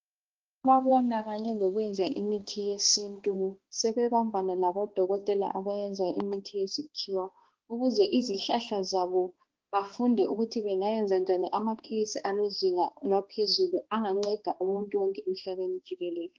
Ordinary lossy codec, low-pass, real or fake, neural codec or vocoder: Opus, 16 kbps; 7.2 kHz; fake; codec, 16 kHz, 2 kbps, X-Codec, HuBERT features, trained on balanced general audio